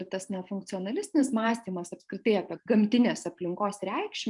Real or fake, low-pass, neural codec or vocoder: fake; 10.8 kHz; vocoder, 44.1 kHz, 128 mel bands every 512 samples, BigVGAN v2